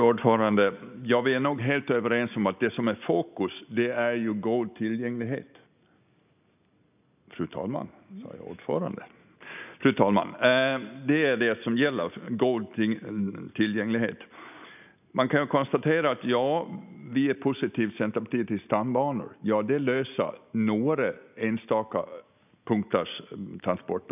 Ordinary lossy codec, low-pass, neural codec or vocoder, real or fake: none; 3.6 kHz; none; real